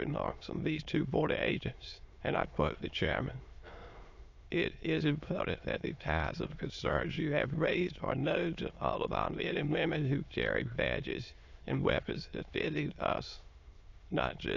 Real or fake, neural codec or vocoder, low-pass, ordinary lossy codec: fake; autoencoder, 22.05 kHz, a latent of 192 numbers a frame, VITS, trained on many speakers; 7.2 kHz; AAC, 48 kbps